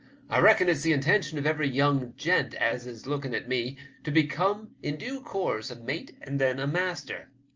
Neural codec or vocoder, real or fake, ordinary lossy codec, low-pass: none; real; Opus, 24 kbps; 7.2 kHz